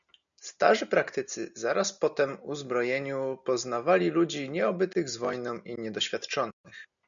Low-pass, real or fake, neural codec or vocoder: 7.2 kHz; real; none